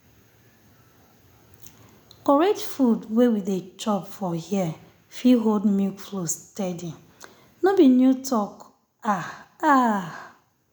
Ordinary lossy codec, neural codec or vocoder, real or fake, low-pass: none; none; real; none